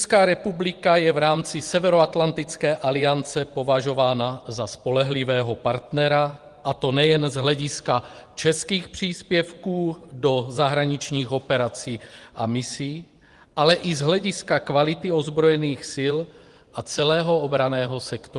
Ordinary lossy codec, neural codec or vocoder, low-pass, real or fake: Opus, 24 kbps; vocoder, 24 kHz, 100 mel bands, Vocos; 10.8 kHz; fake